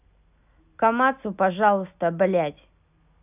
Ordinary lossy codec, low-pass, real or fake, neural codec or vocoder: none; 3.6 kHz; real; none